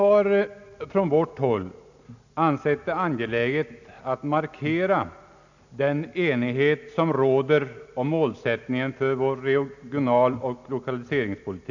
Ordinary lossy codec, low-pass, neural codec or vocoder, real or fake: none; 7.2 kHz; none; real